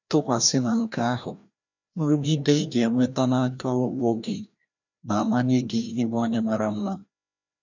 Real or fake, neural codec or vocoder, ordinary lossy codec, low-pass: fake; codec, 16 kHz, 1 kbps, FreqCodec, larger model; none; 7.2 kHz